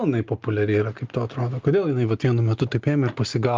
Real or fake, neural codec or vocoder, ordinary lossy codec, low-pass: real; none; Opus, 32 kbps; 7.2 kHz